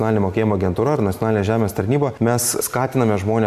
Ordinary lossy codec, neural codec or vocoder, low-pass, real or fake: MP3, 96 kbps; none; 14.4 kHz; real